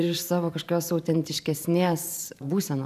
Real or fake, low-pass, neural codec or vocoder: fake; 14.4 kHz; vocoder, 44.1 kHz, 128 mel bands every 512 samples, BigVGAN v2